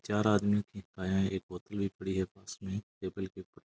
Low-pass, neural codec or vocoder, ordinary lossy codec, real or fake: none; none; none; real